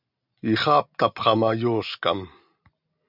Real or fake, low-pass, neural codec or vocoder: real; 5.4 kHz; none